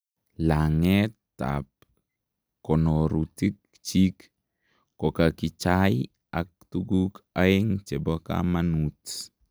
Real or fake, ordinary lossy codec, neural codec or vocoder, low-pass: real; none; none; none